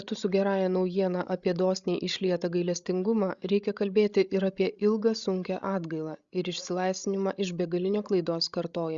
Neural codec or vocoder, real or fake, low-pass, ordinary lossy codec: codec, 16 kHz, 16 kbps, FreqCodec, larger model; fake; 7.2 kHz; Opus, 64 kbps